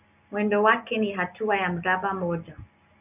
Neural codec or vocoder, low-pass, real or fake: none; 3.6 kHz; real